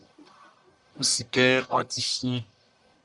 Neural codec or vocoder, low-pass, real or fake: codec, 44.1 kHz, 1.7 kbps, Pupu-Codec; 10.8 kHz; fake